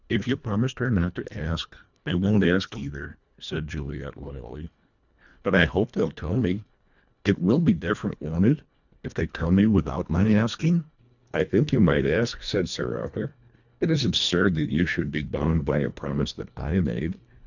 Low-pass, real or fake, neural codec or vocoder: 7.2 kHz; fake; codec, 24 kHz, 1.5 kbps, HILCodec